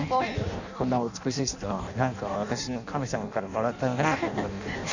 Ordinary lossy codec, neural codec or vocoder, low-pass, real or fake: none; codec, 16 kHz in and 24 kHz out, 0.6 kbps, FireRedTTS-2 codec; 7.2 kHz; fake